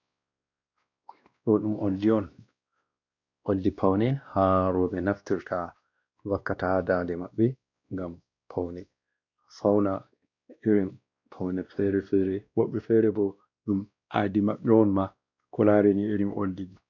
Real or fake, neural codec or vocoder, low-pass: fake; codec, 16 kHz, 1 kbps, X-Codec, WavLM features, trained on Multilingual LibriSpeech; 7.2 kHz